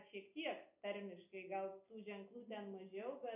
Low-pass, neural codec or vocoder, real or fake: 3.6 kHz; none; real